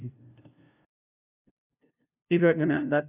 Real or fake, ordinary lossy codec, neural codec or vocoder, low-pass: fake; none; codec, 16 kHz, 0.5 kbps, FunCodec, trained on LibriTTS, 25 frames a second; 3.6 kHz